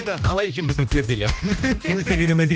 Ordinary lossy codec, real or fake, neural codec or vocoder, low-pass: none; fake; codec, 16 kHz, 1 kbps, X-Codec, HuBERT features, trained on general audio; none